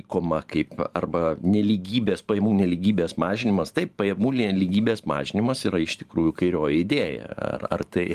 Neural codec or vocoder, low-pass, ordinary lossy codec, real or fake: vocoder, 44.1 kHz, 128 mel bands every 512 samples, BigVGAN v2; 14.4 kHz; Opus, 32 kbps; fake